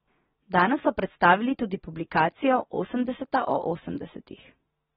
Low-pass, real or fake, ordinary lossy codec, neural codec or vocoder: 19.8 kHz; real; AAC, 16 kbps; none